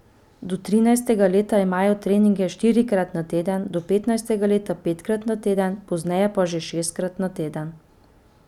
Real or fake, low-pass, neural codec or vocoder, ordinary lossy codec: real; 19.8 kHz; none; none